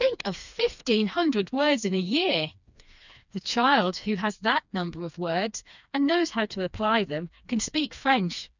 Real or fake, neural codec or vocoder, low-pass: fake; codec, 16 kHz, 2 kbps, FreqCodec, smaller model; 7.2 kHz